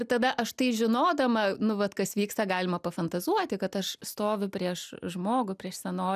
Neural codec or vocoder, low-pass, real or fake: none; 14.4 kHz; real